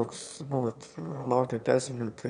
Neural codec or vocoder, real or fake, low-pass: autoencoder, 22.05 kHz, a latent of 192 numbers a frame, VITS, trained on one speaker; fake; 9.9 kHz